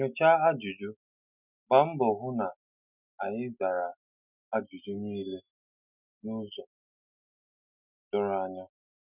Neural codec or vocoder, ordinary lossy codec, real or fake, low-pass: none; none; real; 3.6 kHz